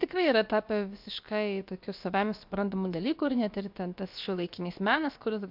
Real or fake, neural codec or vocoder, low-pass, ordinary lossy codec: fake; codec, 16 kHz, about 1 kbps, DyCAST, with the encoder's durations; 5.4 kHz; MP3, 48 kbps